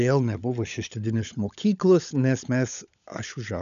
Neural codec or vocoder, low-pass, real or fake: codec, 16 kHz, 16 kbps, FunCodec, trained on LibriTTS, 50 frames a second; 7.2 kHz; fake